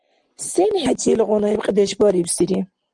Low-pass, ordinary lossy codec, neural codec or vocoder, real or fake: 10.8 kHz; Opus, 24 kbps; none; real